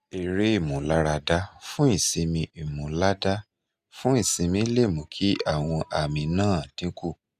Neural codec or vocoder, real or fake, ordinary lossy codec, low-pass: none; real; Opus, 64 kbps; 14.4 kHz